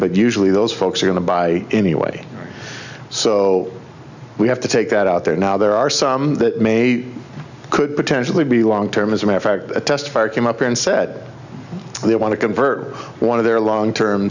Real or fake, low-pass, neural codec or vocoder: real; 7.2 kHz; none